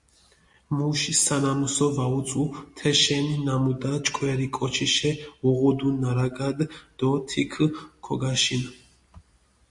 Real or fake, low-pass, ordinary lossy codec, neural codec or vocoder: real; 10.8 kHz; MP3, 48 kbps; none